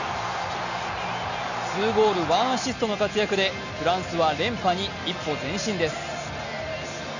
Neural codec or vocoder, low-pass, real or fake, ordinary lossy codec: none; 7.2 kHz; real; none